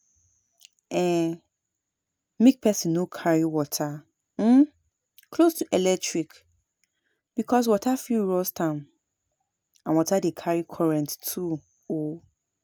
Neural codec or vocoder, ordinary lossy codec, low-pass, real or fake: none; none; none; real